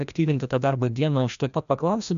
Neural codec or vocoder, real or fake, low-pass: codec, 16 kHz, 1 kbps, FreqCodec, larger model; fake; 7.2 kHz